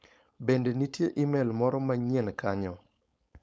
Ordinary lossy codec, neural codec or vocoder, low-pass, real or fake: none; codec, 16 kHz, 4.8 kbps, FACodec; none; fake